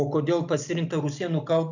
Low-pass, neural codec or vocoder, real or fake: 7.2 kHz; none; real